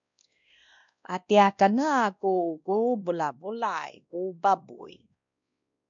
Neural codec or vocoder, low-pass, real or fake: codec, 16 kHz, 1 kbps, X-Codec, WavLM features, trained on Multilingual LibriSpeech; 7.2 kHz; fake